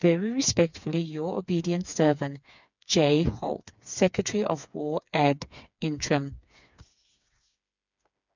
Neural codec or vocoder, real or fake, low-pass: codec, 16 kHz, 4 kbps, FreqCodec, smaller model; fake; 7.2 kHz